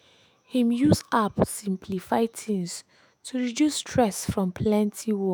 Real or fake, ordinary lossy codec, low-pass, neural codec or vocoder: fake; none; none; autoencoder, 48 kHz, 128 numbers a frame, DAC-VAE, trained on Japanese speech